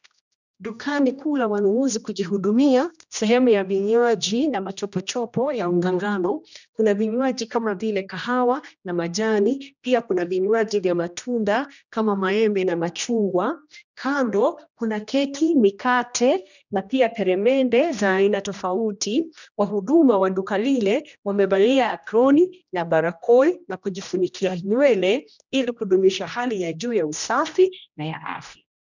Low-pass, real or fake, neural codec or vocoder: 7.2 kHz; fake; codec, 16 kHz, 1 kbps, X-Codec, HuBERT features, trained on general audio